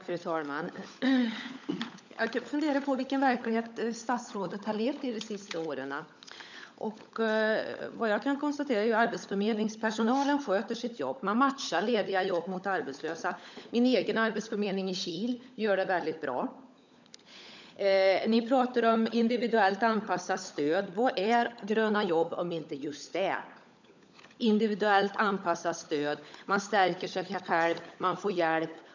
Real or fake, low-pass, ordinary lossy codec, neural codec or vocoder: fake; 7.2 kHz; none; codec, 16 kHz, 16 kbps, FunCodec, trained on LibriTTS, 50 frames a second